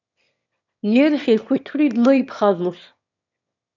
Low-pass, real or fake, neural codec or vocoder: 7.2 kHz; fake; autoencoder, 22.05 kHz, a latent of 192 numbers a frame, VITS, trained on one speaker